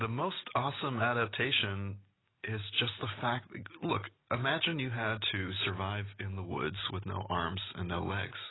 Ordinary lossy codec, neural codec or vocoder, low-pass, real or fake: AAC, 16 kbps; none; 7.2 kHz; real